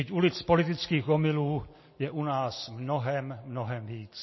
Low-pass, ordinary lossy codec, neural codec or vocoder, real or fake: 7.2 kHz; MP3, 24 kbps; none; real